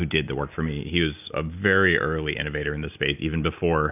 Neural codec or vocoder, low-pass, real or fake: none; 3.6 kHz; real